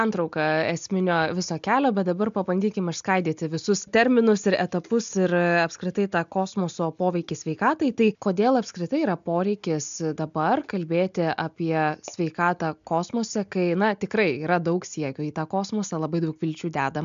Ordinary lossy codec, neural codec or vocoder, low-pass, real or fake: MP3, 64 kbps; none; 7.2 kHz; real